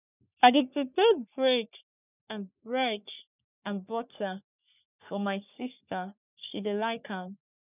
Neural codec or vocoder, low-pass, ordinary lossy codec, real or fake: codec, 44.1 kHz, 3.4 kbps, Pupu-Codec; 3.6 kHz; none; fake